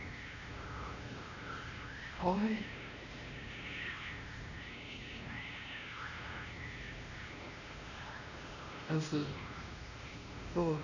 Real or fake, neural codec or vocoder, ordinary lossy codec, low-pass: fake; codec, 16 kHz, 1 kbps, X-Codec, WavLM features, trained on Multilingual LibriSpeech; none; 7.2 kHz